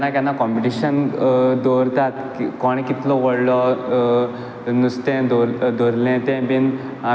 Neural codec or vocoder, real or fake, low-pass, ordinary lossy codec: none; real; none; none